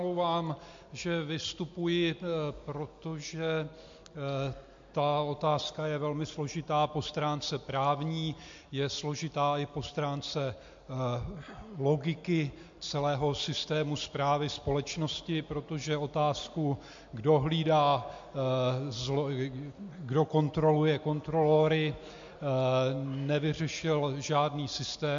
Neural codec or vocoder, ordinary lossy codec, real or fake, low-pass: none; MP3, 48 kbps; real; 7.2 kHz